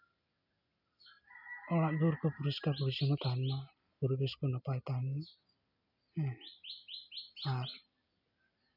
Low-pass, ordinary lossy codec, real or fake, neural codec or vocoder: 5.4 kHz; none; real; none